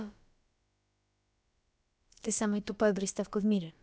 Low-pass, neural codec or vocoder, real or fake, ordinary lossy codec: none; codec, 16 kHz, about 1 kbps, DyCAST, with the encoder's durations; fake; none